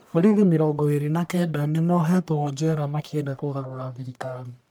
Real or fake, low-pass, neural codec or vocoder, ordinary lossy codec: fake; none; codec, 44.1 kHz, 1.7 kbps, Pupu-Codec; none